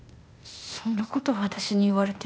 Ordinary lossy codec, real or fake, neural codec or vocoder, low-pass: none; fake; codec, 16 kHz, 0.8 kbps, ZipCodec; none